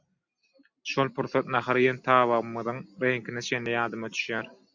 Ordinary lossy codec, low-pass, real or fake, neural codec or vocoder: MP3, 64 kbps; 7.2 kHz; real; none